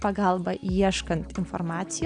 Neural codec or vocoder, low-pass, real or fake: none; 9.9 kHz; real